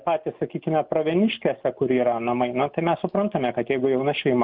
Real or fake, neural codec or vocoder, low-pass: real; none; 5.4 kHz